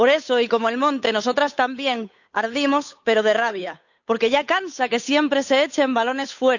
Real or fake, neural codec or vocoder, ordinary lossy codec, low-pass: fake; codec, 16 kHz, 8 kbps, FunCodec, trained on Chinese and English, 25 frames a second; none; 7.2 kHz